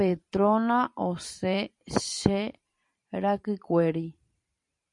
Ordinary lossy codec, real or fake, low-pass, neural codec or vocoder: MP3, 48 kbps; fake; 10.8 kHz; vocoder, 44.1 kHz, 128 mel bands every 512 samples, BigVGAN v2